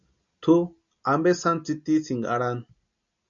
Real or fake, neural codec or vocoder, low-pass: real; none; 7.2 kHz